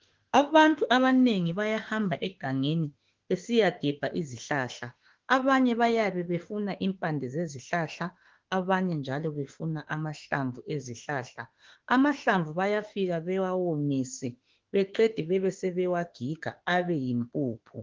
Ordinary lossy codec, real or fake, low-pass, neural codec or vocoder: Opus, 16 kbps; fake; 7.2 kHz; autoencoder, 48 kHz, 32 numbers a frame, DAC-VAE, trained on Japanese speech